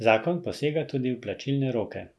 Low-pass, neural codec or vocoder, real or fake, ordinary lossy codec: none; none; real; none